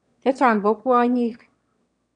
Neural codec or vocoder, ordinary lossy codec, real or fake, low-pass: autoencoder, 22.05 kHz, a latent of 192 numbers a frame, VITS, trained on one speaker; none; fake; 9.9 kHz